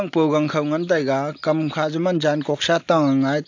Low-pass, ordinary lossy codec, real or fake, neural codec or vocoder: 7.2 kHz; AAC, 48 kbps; real; none